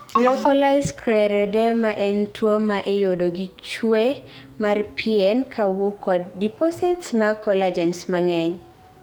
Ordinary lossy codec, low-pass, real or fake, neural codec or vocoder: none; none; fake; codec, 44.1 kHz, 2.6 kbps, SNAC